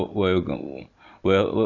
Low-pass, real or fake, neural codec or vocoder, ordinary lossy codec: 7.2 kHz; real; none; none